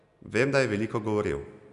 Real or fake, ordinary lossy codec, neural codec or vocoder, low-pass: real; none; none; 10.8 kHz